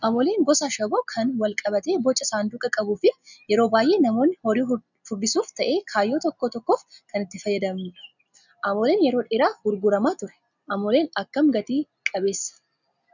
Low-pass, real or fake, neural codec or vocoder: 7.2 kHz; real; none